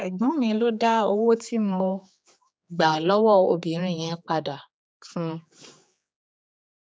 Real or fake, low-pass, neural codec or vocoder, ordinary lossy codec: fake; none; codec, 16 kHz, 4 kbps, X-Codec, HuBERT features, trained on general audio; none